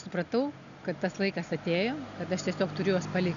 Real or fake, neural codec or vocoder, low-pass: real; none; 7.2 kHz